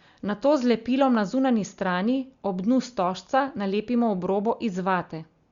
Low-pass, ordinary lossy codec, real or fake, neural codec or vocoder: 7.2 kHz; Opus, 64 kbps; real; none